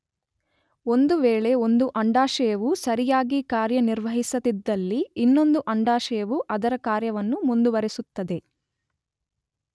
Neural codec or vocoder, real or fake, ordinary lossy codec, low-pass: none; real; none; none